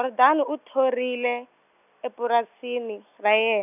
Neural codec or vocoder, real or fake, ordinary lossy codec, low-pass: none; real; none; 3.6 kHz